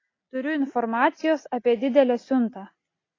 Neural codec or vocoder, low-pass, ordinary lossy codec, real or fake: none; 7.2 kHz; AAC, 32 kbps; real